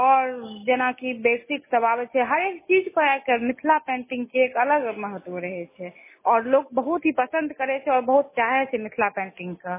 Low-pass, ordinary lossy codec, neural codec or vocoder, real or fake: 3.6 kHz; MP3, 16 kbps; none; real